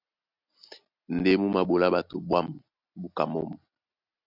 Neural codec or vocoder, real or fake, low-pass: none; real; 5.4 kHz